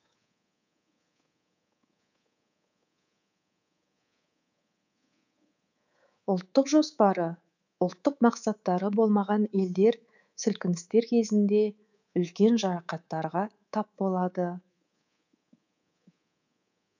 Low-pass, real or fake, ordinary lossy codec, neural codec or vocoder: 7.2 kHz; fake; none; codec, 24 kHz, 3.1 kbps, DualCodec